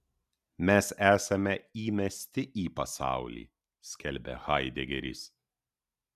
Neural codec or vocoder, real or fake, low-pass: none; real; 14.4 kHz